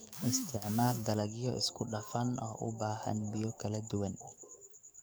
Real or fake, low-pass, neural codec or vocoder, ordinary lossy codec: fake; none; codec, 44.1 kHz, 7.8 kbps, DAC; none